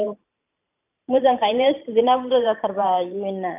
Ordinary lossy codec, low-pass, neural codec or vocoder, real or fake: none; 3.6 kHz; vocoder, 44.1 kHz, 128 mel bands every 512 samples, BigVGAN v2; fake